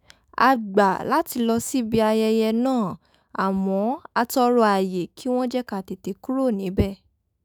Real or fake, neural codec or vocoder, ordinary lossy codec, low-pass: fake; autoencoder, 48 kHz, 128 numbers a frame, DAC-VAE, trained on Japanese speech; none; none